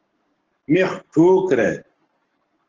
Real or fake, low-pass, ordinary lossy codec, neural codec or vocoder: fake; 7.2 kHz; Opus, 16 kbps; codec, 44.1 kHz, 7.8 kbps, Pupu-Codec